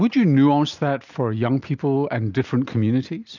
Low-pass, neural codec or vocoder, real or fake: 7.2 kHz; none; real